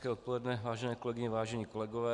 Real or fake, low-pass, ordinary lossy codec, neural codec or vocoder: real; 10.8 kHz; Opus, 64 kbps; none